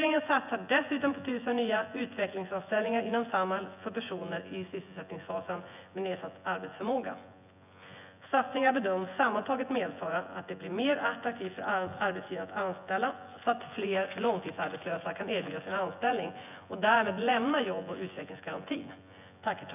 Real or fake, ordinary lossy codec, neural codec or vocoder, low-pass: fake; none; vocoder, 24 kHz, 100 mel bands, Vocos; 3.6 kHz